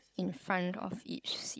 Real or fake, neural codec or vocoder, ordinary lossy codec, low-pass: fake; codec, 16 kHz, 16 kbps, FunCodec, trained on Chinese and English, 50 frames a second; none; none